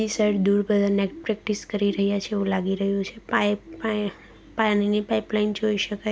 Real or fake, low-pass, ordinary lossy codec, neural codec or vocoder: real; none; none; none